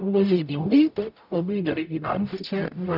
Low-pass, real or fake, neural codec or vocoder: 5.4 kHz; fake; codec, 44.1 kHz, 0.9 kbps, DAC